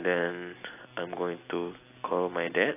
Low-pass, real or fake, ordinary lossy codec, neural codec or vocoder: 3.6 kHz; real; AAC, 24 kbps; none